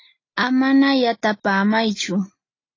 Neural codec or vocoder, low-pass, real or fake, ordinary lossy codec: none; 7.2 kHz; real; AAC, 32 kbps